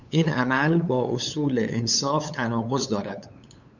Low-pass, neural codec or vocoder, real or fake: 7.2 kHz; codec, 16 kHz, 8 kbps, FunCodec, trained on LibriTTS, 25 frames a second; fake